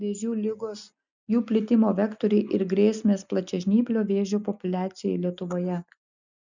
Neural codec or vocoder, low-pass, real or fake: none; 7.2 kHz; real